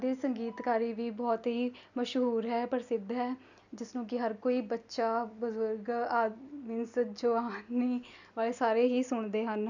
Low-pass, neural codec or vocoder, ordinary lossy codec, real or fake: 7.2 kHz; none; none; real